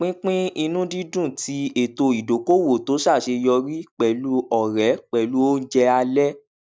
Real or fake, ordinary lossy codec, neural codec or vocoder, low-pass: real; none; none; none